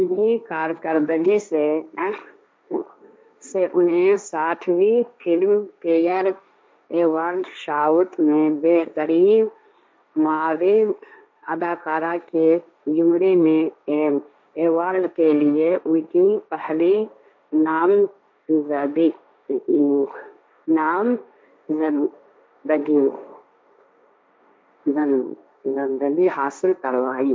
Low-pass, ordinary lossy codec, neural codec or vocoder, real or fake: none; none; codec, 16 kHz, 1.1 kbps, Voila-Tokenizer; fake